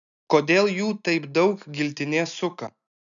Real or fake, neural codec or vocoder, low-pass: real; none; 7.2 kHz